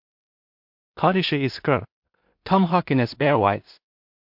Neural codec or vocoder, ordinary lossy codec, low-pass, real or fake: codec, 16 kHz in and 24 kHz out, 0.4 kbps, LongCat-Audio-Codec, two codebook decoder; MP3, 48 kbps; 5.4 kHz; fake